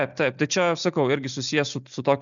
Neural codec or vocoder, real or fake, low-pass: none; real; 7.2 kHz